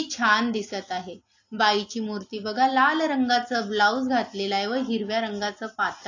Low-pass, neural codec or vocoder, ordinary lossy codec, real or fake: 7.2 kHz; none; AAC, 48 kbps; real